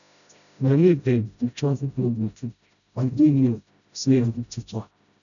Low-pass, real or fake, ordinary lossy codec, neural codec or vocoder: 7.2 kHz; fake; none; codec, 16 kHz, 0.5 kbps, FreqCodec, smaller model